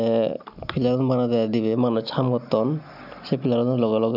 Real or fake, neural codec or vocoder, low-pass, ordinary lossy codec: real; none; 5.4 kHz; none